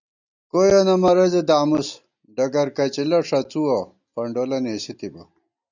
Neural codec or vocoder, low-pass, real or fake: none; 7.2 kHz; real